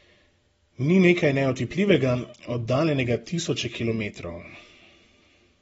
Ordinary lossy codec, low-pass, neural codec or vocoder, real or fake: AAC, 24 kbps; 19.8 kHz; none; real